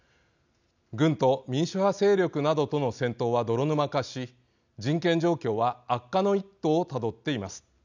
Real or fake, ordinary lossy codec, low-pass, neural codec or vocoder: real; none; 7.2 kHz; none